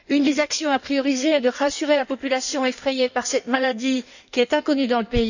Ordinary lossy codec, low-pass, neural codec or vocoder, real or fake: none; 7.2 kHz; codec, 16 kHz in and 24 kHz out, 1.1 kbps, FireRedTTS-2 codec; fake